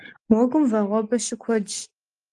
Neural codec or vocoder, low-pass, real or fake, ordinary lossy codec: none; 10.8 kHz; real; Opus, 24 kbps